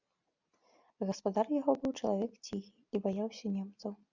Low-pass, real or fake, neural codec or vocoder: 7.2 kHz; real; none